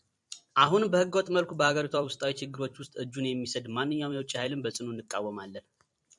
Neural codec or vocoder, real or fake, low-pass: vocoder, 44.1 kHz, 128 mel bands every 256 samples, BigVGAN v2; fake; 10.8 kHz